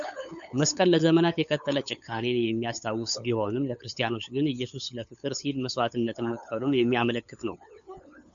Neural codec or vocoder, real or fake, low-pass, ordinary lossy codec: codec, 16 kHz, 8 kbps, FunCodec, trained on LibriTTS, 25 frames a second; fake; 7.2 kHz; MP3, 96 kbps